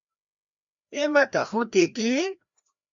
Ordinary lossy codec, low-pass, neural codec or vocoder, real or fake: MP3, 64 kbps; 7.2 kHz; codec, 16 kHz, 1 kbps, FreqCodec, larger model; fake